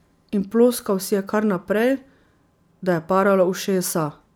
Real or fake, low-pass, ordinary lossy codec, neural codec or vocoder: real; none; none; none